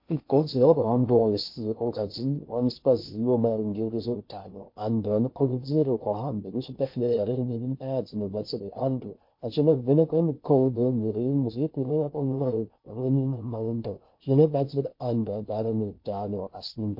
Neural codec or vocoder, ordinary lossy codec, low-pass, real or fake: codec, 16 kHz in and 24 kHz out, 0.6 kbps, FocalCodec, streaming, 2048 codes; MP3, 32 kbps; 5.4 kHz; fake